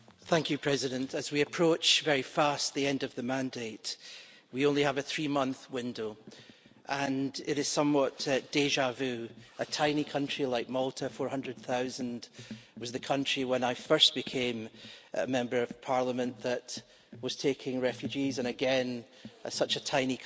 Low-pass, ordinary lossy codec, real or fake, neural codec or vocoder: none; none; real; none